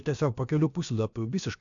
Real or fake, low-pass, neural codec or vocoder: fake; 7.2 kHz; codec, 16 kHz, about 1 kbps, DyCAST, with the encoder's durations